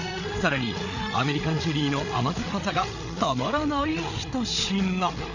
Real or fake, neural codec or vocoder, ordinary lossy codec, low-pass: fake; codec, 16 kHz, 8 kbps, FreqCodec, larger model; none; 7.2 kHz